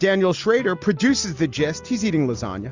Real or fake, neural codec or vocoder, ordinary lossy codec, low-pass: real; none; Opus, 64 kbps; 7.2 kHz